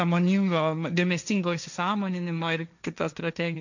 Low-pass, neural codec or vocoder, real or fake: 7.2 kHz; codec, 16 kHz, 1.1 kbps, Voila-Tokenizer; fake